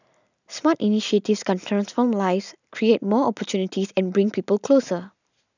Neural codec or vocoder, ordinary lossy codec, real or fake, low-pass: none; none; real; 7.2 kHz